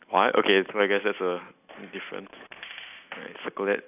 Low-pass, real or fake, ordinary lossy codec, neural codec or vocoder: 3.6 kHz; real; none; none